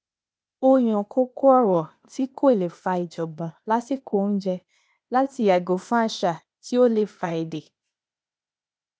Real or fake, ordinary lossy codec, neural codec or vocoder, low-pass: fake; none; codec, 16 kHz, 0.8 kbps, ZipCodec; none